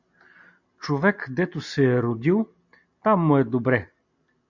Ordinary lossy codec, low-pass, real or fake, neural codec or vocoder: MP3, 64 kbps; 7.2 kHz; real; none